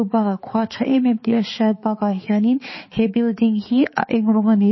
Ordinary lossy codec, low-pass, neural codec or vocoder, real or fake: MP3, 24 kbps; 7.2 kHz; codec, 16 kHz, 4 kbps, X-Codec, HuBERT features, trained on balanced general audio; fake